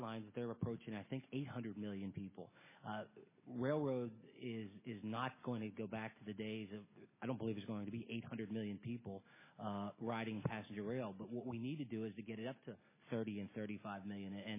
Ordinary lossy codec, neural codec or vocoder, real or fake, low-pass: MP3, 16 kbps; none; real; 3.6 kHz